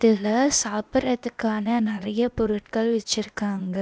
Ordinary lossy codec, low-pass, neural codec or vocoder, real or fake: none; none; codec, 16 kHz, 0.8 kbps, ZipCodec; fake